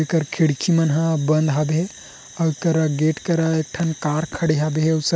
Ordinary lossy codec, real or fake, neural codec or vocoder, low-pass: none; real; none; none